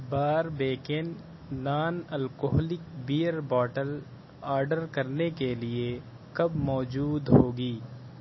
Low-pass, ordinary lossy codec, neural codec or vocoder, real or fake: 7.2 kHz; MP3, 24 kbps; none; real